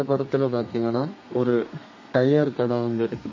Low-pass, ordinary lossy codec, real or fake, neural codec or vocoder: 7.2 kHz; MP3, 32 kbps; fake; codec, 32 kHz, 1.9 kbps, SNAC